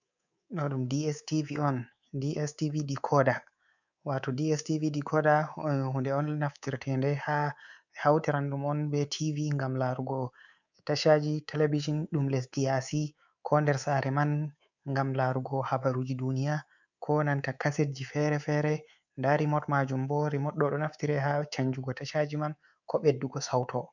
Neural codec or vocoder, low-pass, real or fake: codec, 24 kHz, 3.1 kbps, DualCodec; 7.2 kHz; fake